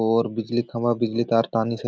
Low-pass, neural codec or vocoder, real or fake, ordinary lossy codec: none; none; real; none